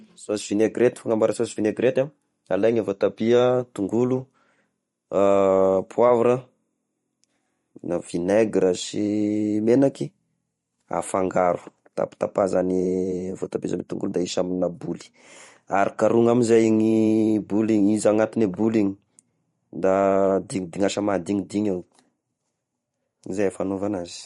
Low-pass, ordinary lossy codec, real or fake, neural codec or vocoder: 19.8 kHz; MP3, 48 kbps; fake; vocoder, 44.1 kHz, 128 mel bands every 512 samples, BigVGAN v2